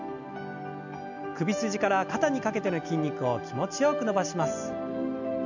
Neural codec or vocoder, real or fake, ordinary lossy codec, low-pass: none; real; none; 7.2 kHz